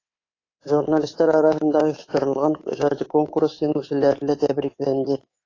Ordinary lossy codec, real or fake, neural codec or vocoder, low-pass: AAC, 32 kbps; fake; codec, 24 kHz, 3.1 kbps, DualCodec; 7.2 kHz